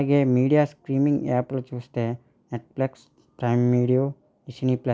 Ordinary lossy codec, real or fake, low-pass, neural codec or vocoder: none; real; none; none